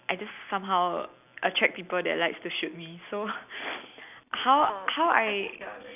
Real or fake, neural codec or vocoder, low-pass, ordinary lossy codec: real; none; 3.6 kHz; none